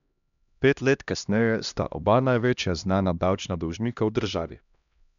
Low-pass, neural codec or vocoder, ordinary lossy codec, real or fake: 7.2 kHz; codec, 16 kHz, 1 kbps, X-Codec, HuBERT features, trained on LibriSpeech; none; fake